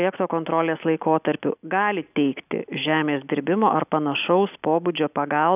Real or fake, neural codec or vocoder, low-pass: real; none; 3.6 kHz